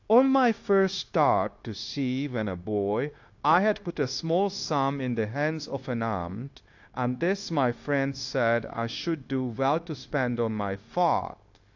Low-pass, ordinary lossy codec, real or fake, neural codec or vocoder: 7.2 kHz; AAC, 48 kbps; fake; codec, 16 kHz, 0.9 kbps, LongCat-Audio-Codec